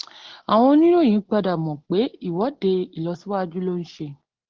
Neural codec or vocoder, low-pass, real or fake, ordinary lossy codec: none; 7.2 kHz; real; Opus, 16 kbps